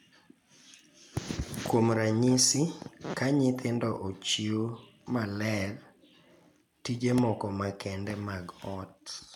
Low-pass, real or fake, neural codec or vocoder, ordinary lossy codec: 19.8 kHz; real; none; none